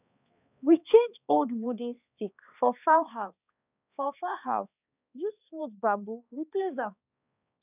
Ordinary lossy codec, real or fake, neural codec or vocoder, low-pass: none; fake; codec, 16 kHz, 2 kbps, X-Codec, HuBERT features, trained on balanced general audio; 3.6 kHz